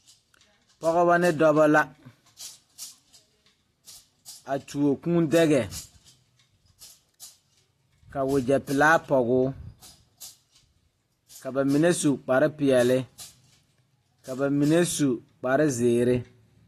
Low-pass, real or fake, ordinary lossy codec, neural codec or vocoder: 14.4 kHz; real; AAC, 48 kbps; none